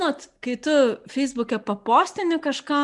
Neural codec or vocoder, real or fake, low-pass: none; real; 10.8 kHz